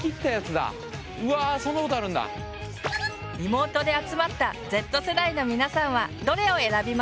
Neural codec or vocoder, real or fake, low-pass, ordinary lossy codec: none; real; none; none